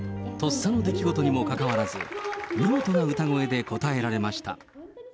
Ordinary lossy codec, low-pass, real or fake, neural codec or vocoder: none; none; real; none